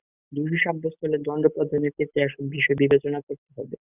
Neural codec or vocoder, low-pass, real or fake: none; 3.6 kHz; real